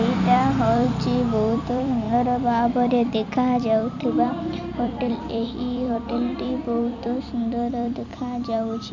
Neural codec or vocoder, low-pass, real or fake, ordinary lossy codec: vocoder, 44.1 kHz, 128 mel bands every 256 samples, BigVGAN v2; 7.2 kHz; fake; none